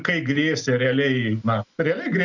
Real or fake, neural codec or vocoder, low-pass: real; none; 7.2 kHz